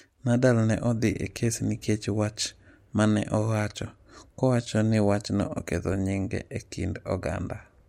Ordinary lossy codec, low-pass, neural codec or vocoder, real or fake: MP3, 64 kbps; 19.8 kHz; none; real